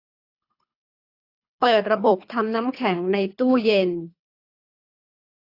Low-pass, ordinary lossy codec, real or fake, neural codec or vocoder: 5.4 kHz; AAC, 32 kbps; fake; codec, 24 kHz, 3 kbps, HILCodec